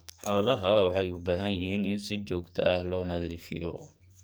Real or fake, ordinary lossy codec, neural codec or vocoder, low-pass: fake; none; codec, 44.1 kHz, 2.6 kbps, SNAC; none